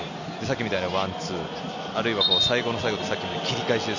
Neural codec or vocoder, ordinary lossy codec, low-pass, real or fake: none; none; 7.2 kHz; real